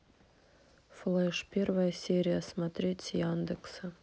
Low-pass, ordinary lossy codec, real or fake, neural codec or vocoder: none; none; real; none